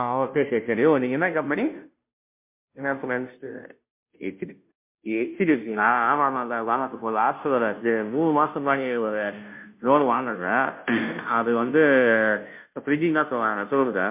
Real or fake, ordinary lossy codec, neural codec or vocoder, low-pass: fake; MP3, 32 kbps; codec, 16 kHz, 0.5 kbps, FunCodec, trained on Chinese and English, 25 frames a second; 3.6 kHz